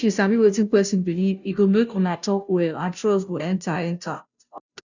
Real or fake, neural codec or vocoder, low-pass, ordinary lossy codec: fake; codec, 16 kHz, 0.5 kbps, FunCodec, trained on Chinese and English, 25 frames a second; 7.2 kHz; none